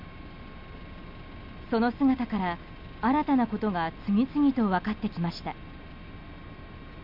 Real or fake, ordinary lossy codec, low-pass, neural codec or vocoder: real; none; 5.4 kHz; none